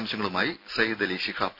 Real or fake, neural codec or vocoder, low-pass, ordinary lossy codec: real; none; 5.4 kHz; none